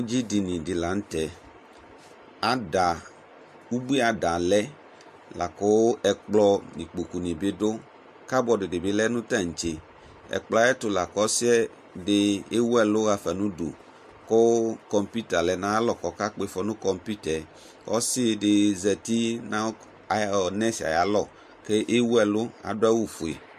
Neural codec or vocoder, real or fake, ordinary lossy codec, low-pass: none; real; MP3, 64 kbps; 14.4 kHz